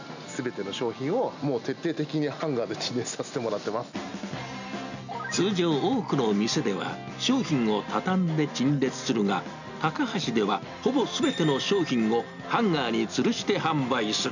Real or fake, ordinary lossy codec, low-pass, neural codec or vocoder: real; none; 7.2 kHz; none